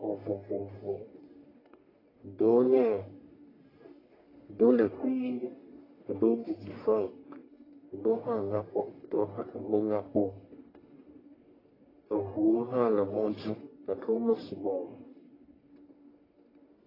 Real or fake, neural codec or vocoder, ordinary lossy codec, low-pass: fake; codec, 44.1 kHz, 1.7 kbps, Pupu-Codec; AAC, 24 kbps; 5.4 kHz